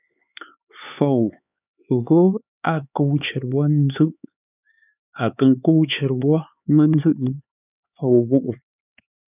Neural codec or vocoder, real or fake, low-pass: codec, 16 kHz, 4 kbps, X-Codec, HuBERT features, trained on LibriSpeech; fake; 3.6 kHz